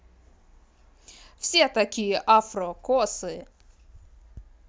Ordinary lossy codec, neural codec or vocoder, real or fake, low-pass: none; none; real; none